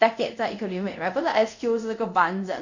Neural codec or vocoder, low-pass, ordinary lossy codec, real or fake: codec, 24 kHz, 0.5 kbps, DualCodec; 7.2 kHz; none; fake